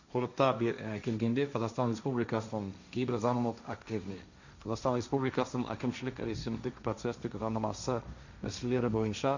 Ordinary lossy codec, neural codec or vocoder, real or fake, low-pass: none; codec, 16 kHz, 1.1 kbps, Voila-Tokenizer; fake; 7.2 kHz